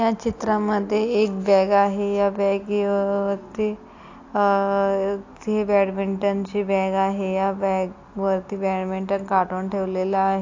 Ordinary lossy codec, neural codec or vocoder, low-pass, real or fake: AAC, 48 kbps; none; 7.2 kHz; real